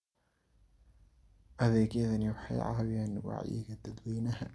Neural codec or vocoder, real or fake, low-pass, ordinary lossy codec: none; real; none; none